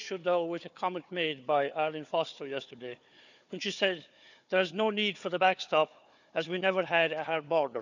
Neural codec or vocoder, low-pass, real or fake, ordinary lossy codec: codec, 16 kHz, 4 kbps, FunCodec, trained on Chinese and English, 50 frames a second; 7.2 kHz; fake; none